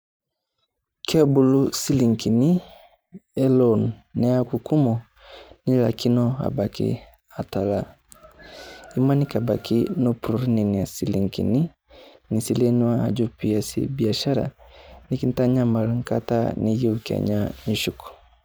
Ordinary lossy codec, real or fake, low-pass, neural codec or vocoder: none; fake; none; vocoder, 44.1 kHz, 128 mel bands every 512 samples, BigVGAN v2